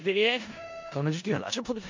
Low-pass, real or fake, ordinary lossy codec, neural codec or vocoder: 7.2 kHz; fake; MP3, 64 kbps; codec, 16 kHz in and 24 kHz out, 0.4 kbps, LongCat-Audio-Codec, four codebook decoder